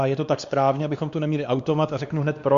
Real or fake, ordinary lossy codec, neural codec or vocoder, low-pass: fake; Opus, 64 kbps; codec, 16 kHz, 2 kbps, X-Codec, WavLM features, trained on Multilingual LibriSpeech; 7.2 kHz